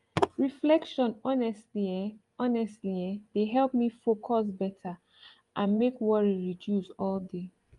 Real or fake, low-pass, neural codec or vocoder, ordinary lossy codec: fake; 10.8 kHz; vocoder, 24 kHz, 100 mel bands, Vocos; Opus, 32 kbps